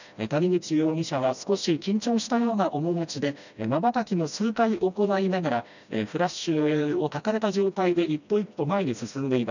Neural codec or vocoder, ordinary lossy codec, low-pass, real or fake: codec, 16 kHz, 1 kbps, FreqCodec, smaller model; none; 7.2 kHz; fake